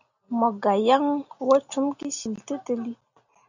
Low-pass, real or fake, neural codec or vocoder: 7.2 kHz; real; none